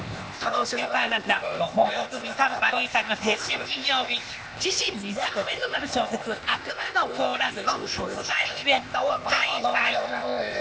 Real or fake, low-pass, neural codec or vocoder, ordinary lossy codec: fake; none; codec, 16 kHz, 0.8 kbps, ZipCodec; none